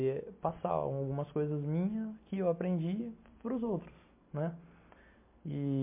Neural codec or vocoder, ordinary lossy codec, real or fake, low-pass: none; MP3, 24 kbps; real; 3.6 kHz